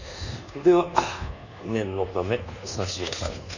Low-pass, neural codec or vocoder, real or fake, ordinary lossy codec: 7.2 kHz; codec, 24 kHz, 1.2 kbps, DualCodec; fake; none